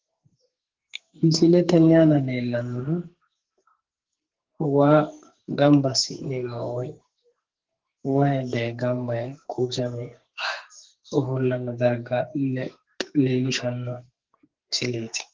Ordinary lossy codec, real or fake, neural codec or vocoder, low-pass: Opus, 16 kbps; fake; codec, 44.1 kHz, 2.6 kbps, SNAC; 7.2 kHz